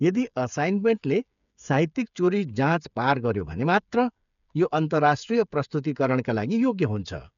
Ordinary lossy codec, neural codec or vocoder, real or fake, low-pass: none; codec, 16 kHz, 8 kbps, FreqCodec, smaller model; fake; 7.2 kHz